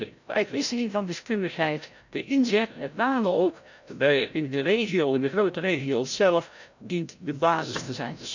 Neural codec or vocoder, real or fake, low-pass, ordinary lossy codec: codec, 16 kHz, 0.5 kbps, FreqCodec, larger model; fake; 7.2 kHz; none